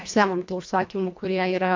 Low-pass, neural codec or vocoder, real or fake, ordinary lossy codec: 7.2 kHz; codec, 24 kHz, 1.5 kbps, HILCodec; fake; MP3, 48 kbps